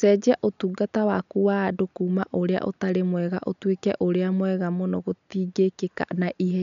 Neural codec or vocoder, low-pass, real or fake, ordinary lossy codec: none; 7.2 kHz; real; none